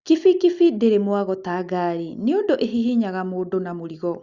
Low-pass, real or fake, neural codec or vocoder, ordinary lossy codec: 7.2 kHz; real; none; Opus, 64 kbps